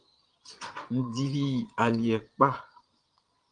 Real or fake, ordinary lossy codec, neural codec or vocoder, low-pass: fake; Opus, 24 kbps; vocoder, 22.05 kHz, 80 mel bands, Vocos; 9.9 kHz